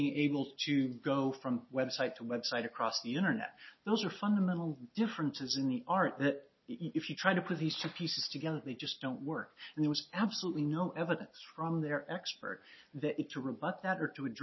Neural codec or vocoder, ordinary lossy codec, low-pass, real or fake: none; MP3, 24 kbps; 7.2 kHz; real